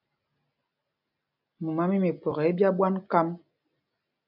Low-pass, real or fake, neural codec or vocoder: 5.4 kHz; real; none